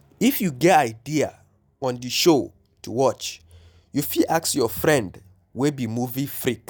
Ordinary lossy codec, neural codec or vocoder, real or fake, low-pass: none; none; real; none